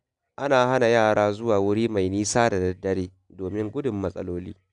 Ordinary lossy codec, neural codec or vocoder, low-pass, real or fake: none; none; 10.8 kHz; real